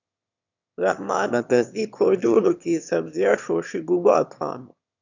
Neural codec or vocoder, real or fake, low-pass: autoencoder, 22.05 kHz, a latent of 192 numbers a frame, VITS, trained on one speaker; fake; 7.2 kHz